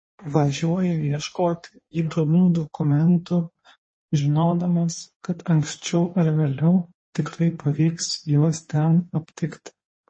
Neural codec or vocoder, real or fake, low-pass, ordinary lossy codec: codec, 16 kHz in and 24 kHz out, 1.1 kbps, FireRedTTS-2 codec; fake; 9.9 kHz; MP3, 32 kbps